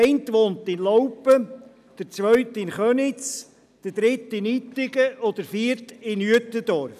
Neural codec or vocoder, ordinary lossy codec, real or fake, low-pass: none; none; real; 14.4 kHz